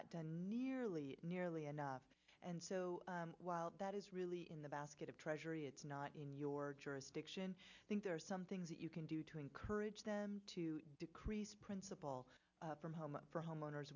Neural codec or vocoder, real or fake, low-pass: none; real; 7.2 kHz